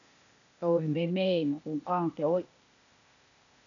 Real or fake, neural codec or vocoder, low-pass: fake; codec, 16 kHz, 0.8 kbps, ZipCodec; 7.2 kHz